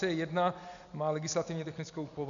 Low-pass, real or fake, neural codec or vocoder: 7.2 kHz; real; none